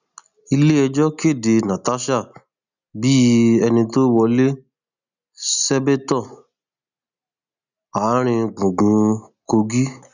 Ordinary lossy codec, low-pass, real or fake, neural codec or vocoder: none; 7.2 kHz; real; none